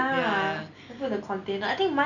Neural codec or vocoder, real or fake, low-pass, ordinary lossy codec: none; real; 7.2 kHz; none